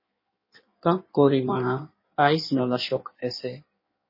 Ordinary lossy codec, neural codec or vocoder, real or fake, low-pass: MP3, 24 kbps; codec, 16 kHz in and 24 kHz out, 1.1 kbps, FireRedTTS-2 codec; fake; 5.4 kHz